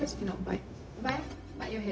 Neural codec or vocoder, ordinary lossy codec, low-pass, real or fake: codec, 16 kHz, 0.4 kbps, LongCat-Audio-Codec; none; none; fake